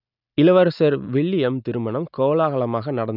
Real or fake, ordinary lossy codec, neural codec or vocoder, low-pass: real; none; none; 5.4 kHz